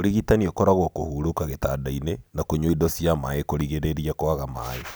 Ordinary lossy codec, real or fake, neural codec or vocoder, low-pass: none; real; none; none